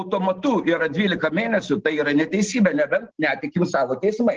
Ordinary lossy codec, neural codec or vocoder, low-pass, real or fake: Opus, 16 kbps; none; 7.2 kHz; real